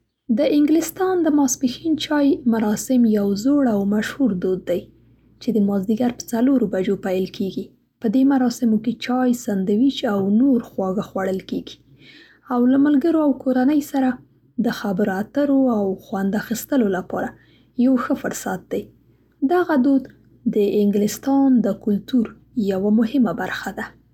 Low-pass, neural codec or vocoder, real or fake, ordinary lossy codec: 19.8 kHz; none; real; none